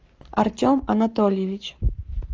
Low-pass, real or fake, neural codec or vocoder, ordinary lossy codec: 7.2 kHz; fake; codec, 44.1 kHz, 7.8 kbps, Pupu-Codec; Opus, 24 kbps